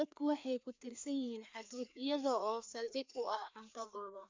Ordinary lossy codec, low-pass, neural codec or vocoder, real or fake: MP3, 64 kbps; 7.2 kHz; codec, 16 kHz, 2 kbps, FreqCodec, larger model; fake